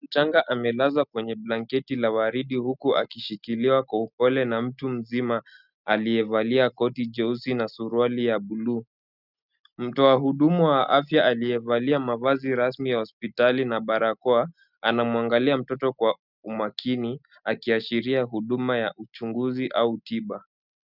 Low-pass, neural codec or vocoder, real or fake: 5.4 kHz; none; real